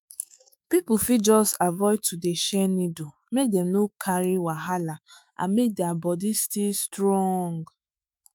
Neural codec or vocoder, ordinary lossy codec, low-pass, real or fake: autoencoder, 48 kHz, 128 numbers a frame, DAC-VAE, trained on Japanese speech; none; none; fake